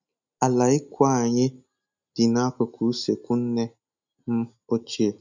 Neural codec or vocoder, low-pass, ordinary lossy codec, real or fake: none; 7.2 kHz; none; real